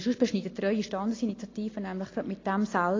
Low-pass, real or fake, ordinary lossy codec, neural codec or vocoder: 7.2 kHz; real; AAC, 32 kbps; none